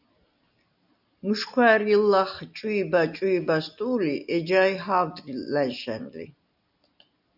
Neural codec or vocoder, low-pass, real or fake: none; 5.4 kHz; real